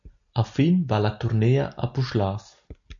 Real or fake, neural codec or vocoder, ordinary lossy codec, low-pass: real; none; AAC, 64 kbps; 7.2 kHz